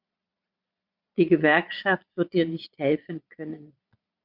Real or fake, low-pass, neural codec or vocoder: fake; 5.4 kHz; vocoder, 22.05 kHz, 80 mel bands, Vocos